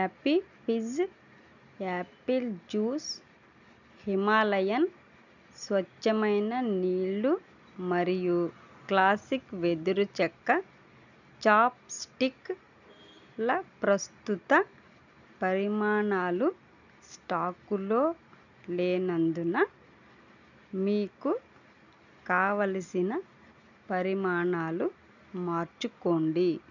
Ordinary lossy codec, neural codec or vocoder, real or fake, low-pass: none; none; real; 7.2 kHz